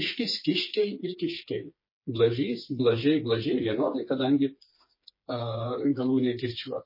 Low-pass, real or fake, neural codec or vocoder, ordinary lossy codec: 5.4 kHz; fake; codec, 16 kHz, 4 kbps, FreqCodec, smaller model; MP3, 24 kbps